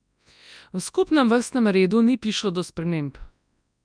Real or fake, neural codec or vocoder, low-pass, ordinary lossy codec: fake; codec, 24 kHz, 0.9 kbps, WavTokenizer, large speech release; 9.9 kHz; none